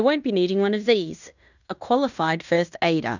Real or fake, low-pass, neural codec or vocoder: fake; 7.2 kHz; codec, 16 kHz in and 24 kHz out, 0.9 kbps, LongCat-Audio-Codec, fine tuned four codebook decoder